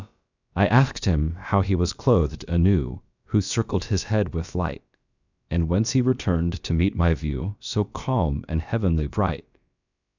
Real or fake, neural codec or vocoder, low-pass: fake; codec, 16 kHz, about 1 kbps, DyCAST, with the encoder's durations; 7.2 kHz